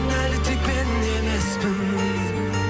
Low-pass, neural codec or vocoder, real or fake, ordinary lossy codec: none; none; real; none